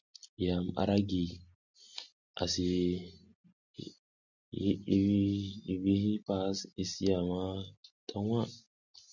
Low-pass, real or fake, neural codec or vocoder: 7.2 kHz; real; none